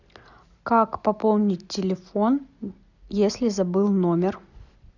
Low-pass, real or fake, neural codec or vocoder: 7.2 kHz; real; none